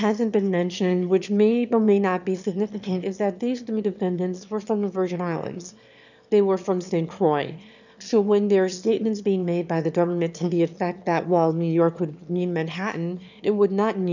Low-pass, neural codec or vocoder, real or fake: 7.2 kHz; autoencoder, 22.05 kHz, a latent of 192 numbers a frame, VITS, trained on one speaker; fake